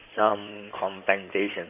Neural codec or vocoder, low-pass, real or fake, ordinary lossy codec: codec, 16 kHz in and 24 kHz out, 2.2 kbps, FireRedTTS-2 codec; 3.6 kHz; fake; none